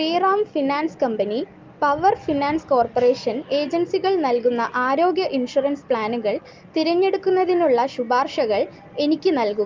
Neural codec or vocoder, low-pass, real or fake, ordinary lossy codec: none; 7.2 kHz; real; Opus, 24 kbps